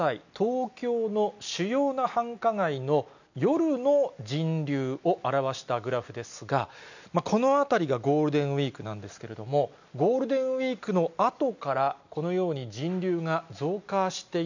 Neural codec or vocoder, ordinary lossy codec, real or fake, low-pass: none; none; real; 7.2 kHz